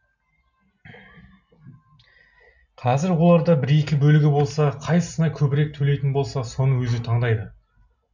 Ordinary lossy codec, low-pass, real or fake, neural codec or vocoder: none; 7.2 kHz; real; none